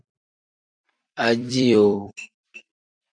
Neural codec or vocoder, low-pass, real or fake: vocoder, 44.1 kHz, 128 mel bands every 256 samples, BigVGAN v2; 9.9 kHz; fake